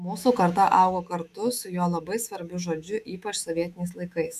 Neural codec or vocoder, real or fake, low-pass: autoencoder, 48 kHz, 128 numbers a frame, DAC-VAE, trained on Japanese speech; fake; 14.4 kHz